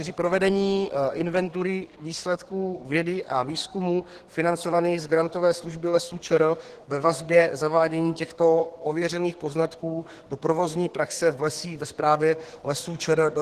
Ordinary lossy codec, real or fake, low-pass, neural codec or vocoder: Opus, 16 kbps; fake; 14.4 kHz; codec, 32 kHz, 1.9 kbps, SNAC